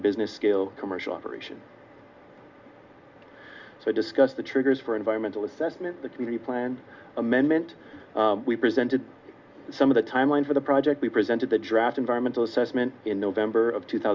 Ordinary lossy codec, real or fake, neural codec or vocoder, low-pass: Opus, 64 kbps; real; none; 7.2 kHz